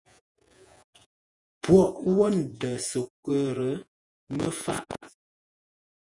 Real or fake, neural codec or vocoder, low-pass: fake; vocoder, 48 kHz, 128 mel bands, Vocos; 10.8 kHz